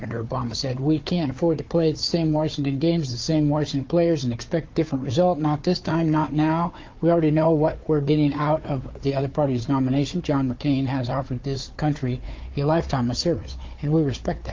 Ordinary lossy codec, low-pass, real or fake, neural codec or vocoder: Opus, 24 kbps; 7.2 kHz; fake; codec, 16 kHz, 4 kbps, FunCodec, trained on Chinese and English, 50 frames a second